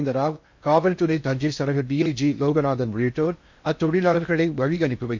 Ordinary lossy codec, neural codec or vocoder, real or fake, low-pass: MP3, 48 kbps; codec, 16 kHz in and 24 kHz out, 0.6 kbps, FocalCodec, streaming, 4096 codes; fake; 7.2 kHz